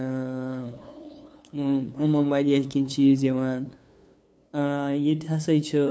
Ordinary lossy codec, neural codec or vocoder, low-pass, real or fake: none; codec, 16 kHz, 2 kbps, FunCodec, trained on LibriTTS, 25 frames a second; none; fake